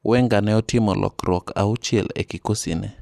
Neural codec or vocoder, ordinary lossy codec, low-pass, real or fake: none; none; 14.4 kHz; real